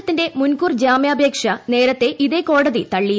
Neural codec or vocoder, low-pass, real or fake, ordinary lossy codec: none; none; real; none